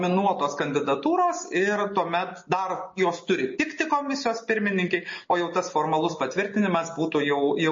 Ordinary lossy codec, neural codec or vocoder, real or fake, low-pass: MP3, 32 kbps; none; real; 7.2 kHz